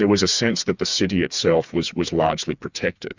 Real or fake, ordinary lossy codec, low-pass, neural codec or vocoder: fake; Opus, 64 kbps; 7.2 kHz; codec, 16 kHz, 4 kbps, FreqCodec, smaller model